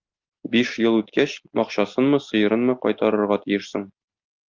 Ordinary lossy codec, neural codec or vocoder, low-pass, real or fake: Opus, 24 kbps; none; 7.2 kHz; real